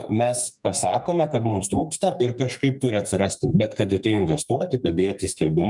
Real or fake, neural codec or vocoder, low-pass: fake; codec, 32 kHz, 1.9 kbps, SNAC; 10.8 kHz